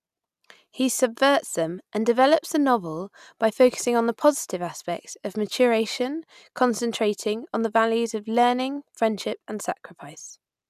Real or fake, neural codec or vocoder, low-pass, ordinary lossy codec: real; none; 14.4 kHz; none